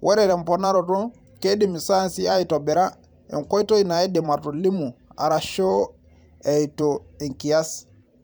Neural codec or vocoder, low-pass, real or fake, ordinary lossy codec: vocoder, 44.1 kHz, 128 mel bands every 512 samples, BigVGAN v2; none; fake; none